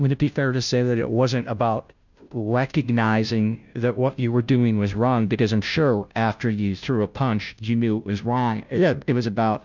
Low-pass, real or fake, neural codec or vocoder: 7.2 kHz; fake; codec, 16 kHz, 0.5 kbps, FunCodec, trained on Chinese and English, 25 frames a second